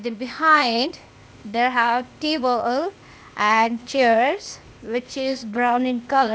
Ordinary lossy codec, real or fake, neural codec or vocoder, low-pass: none; fake; codec, 16 kHz, 0.8 kbps, ZipCodec; none